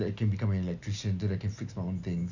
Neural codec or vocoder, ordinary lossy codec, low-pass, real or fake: none; none; 7.2 kHz; real